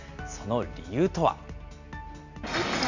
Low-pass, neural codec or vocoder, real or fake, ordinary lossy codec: 7.2 kHz; none; real; none